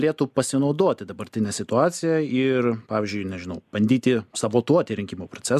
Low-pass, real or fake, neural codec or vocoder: 14.4 kHz; real; none